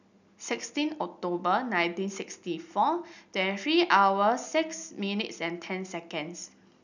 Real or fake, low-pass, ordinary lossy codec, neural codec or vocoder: real; 7.2 kHz; none; none